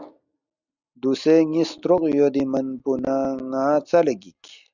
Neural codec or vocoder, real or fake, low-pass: none; real; 7.2 kHz